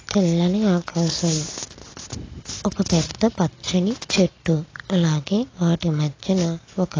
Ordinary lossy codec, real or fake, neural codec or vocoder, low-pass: AAC, 32 kbps; real; none; 7.2 kHz